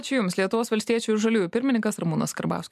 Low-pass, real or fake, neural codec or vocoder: 14.4 kHz; real; none